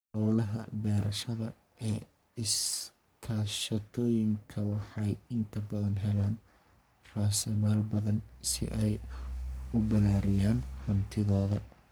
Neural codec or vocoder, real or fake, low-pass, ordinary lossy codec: codec, 44.1 kHz, 3.4 kbps, Pupu-Codec; fake; none; none